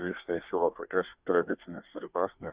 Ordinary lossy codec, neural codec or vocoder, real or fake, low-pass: Opus, 64 kbps; codec, 24 kHz, 1 kbps, SNAC; fake; 3.6 kHz